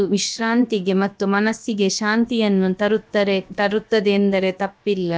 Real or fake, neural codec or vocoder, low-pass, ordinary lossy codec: fake; codec, 16 kHz, about 1 kbps, DyCAST, with the encoder's durations; none; none